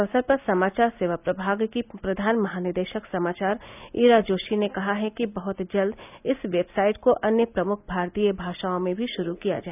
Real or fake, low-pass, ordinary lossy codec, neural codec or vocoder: real; 3.6 kHz; none; none